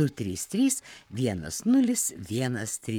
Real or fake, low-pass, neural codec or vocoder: fake; 19.8 kHz; codec, 44.1 kHz, 7.8 kbps, Pupu-Codec